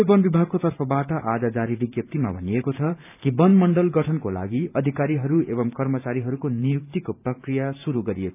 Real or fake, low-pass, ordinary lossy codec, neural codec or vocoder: fake; 3.6 kHz; none; vocoder, 44.1 kHz, 128 mel bands every 512 samples, BigVGAN v2